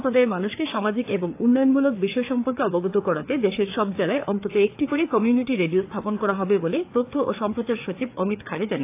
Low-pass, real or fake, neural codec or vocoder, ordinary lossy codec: 3.6 kHz; fake; codec, 16 kHz, 4 kbps, FreqCodec, larger model; AAC, 24 kbps